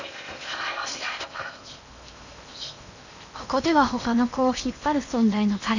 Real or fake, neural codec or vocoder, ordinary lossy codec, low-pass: fake; codec, 16 kHz in and 24 kHz out, 0.8 kbps, FocalCodec, streaming, 65536 codes; none; 7.2 kHz